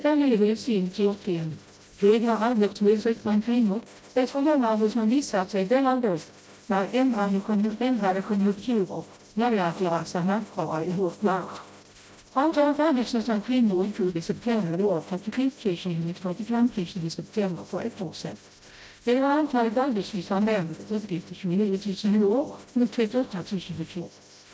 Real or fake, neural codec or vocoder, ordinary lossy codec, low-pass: fake; codec, 16 kHz, 0.5 kbps, FreqCodec, smaller model; none; none